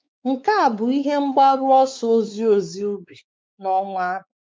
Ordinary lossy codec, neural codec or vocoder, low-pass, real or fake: none; codec, 16 kHz, 4 kbps, X-Codec, WavLM features, trained on Multilingual LibriSpeech; none; fake